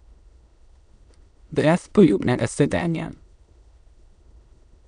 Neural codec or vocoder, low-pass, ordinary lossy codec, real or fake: autoencoder, 22.05 kHz, a latent of 192 numbers a frame, VITS, trained on many speakers; 9.9 kHz; none; fake